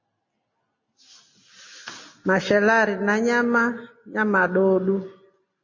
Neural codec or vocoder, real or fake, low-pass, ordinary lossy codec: none; real; 7.2 kHz; MP3, 32 kbps